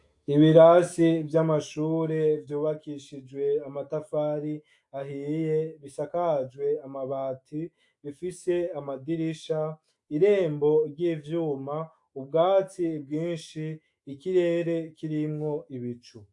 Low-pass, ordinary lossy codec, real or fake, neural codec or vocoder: 10.8 kHz; AAC, 64 kbps; fake; autoencoder, 48 kHz, 128 numbers a frame, DAC-VAE, trained on Japanese speech